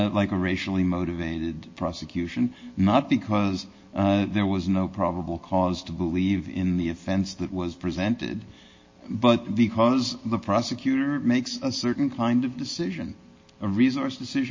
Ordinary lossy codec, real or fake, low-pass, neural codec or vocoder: MP3, 32 kbps; real; 7.2 kHz; none